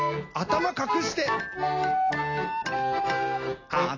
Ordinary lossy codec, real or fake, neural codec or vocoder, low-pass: AAC, 32 kbps; real; none; 7.2 kHz